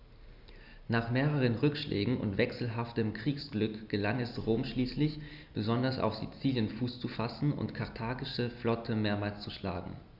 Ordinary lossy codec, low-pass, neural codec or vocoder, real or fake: Opus, 64 kbps; 5.4 kHz; none; real